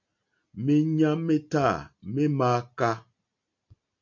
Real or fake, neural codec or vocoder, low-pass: real; none; 7.2 kHz